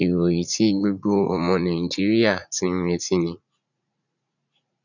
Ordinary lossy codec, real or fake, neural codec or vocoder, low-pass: none; fake; vocoder, 44.1 kHz, 80 mel bands, Vocos; 7.2 kHz